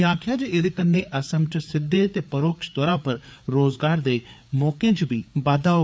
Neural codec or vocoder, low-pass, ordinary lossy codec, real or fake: codec, 16 kHz, 4 kbps, FreqCodec, larger model; none; none; fake